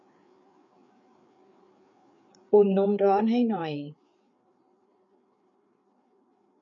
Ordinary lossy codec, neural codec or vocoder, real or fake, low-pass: none; codec, 16 kHz, 4 kbps, FreqCodec, larger model; fake; 7.2 kHz